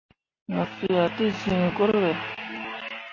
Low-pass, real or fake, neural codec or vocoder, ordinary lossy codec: 7.2 kHz; real; none; MP3, 32 kbps